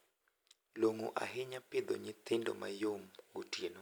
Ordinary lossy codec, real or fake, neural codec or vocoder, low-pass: none; real; none; none